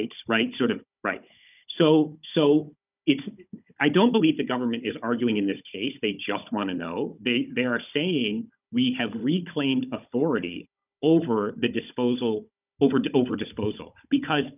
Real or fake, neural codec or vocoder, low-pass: fake; codec, 16 kHz, 16 kbps, FunCodec, trained on Chinese and English, 50 frames a second; 3.6 kHz